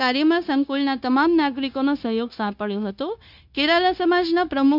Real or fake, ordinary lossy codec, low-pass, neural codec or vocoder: fake; none; 5.4 kHz; codec, 16 kHz, 0.9 kbps, LongCat-Audio-Codec